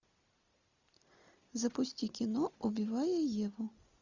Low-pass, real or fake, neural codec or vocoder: 7.2 kHz; real; none